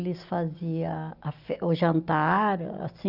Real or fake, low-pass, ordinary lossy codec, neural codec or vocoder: real; 5.4 kHz; none; none